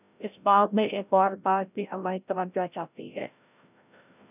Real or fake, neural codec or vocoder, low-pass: fake; codec, 16 kHz, 0.5 kbps, FreqCodec, larger model; 3.6 kHz